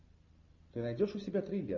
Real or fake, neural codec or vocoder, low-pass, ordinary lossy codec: real; none; 7.2 kHz; MP3, 64 kbps